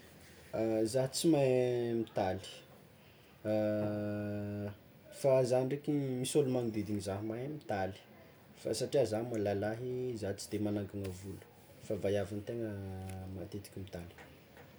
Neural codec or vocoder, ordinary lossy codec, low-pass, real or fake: none; none; none; real